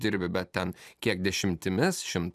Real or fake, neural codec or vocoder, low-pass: fake; vocoder, 44.1 kHz, 128 mel bands every 256 samples, BigVGAN v2; 14.4 kHz